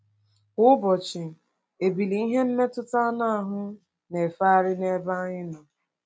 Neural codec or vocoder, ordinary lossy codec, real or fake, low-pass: none; none; real; none